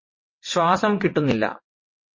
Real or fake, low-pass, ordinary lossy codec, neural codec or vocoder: fake; 7.2 kHz; MP3, 32 kbps; vocoder, 22.05 kHz, 80 mel bands, WaveNeXt